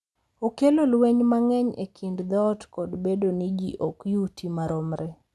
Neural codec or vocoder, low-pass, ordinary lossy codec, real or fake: none; none; none; real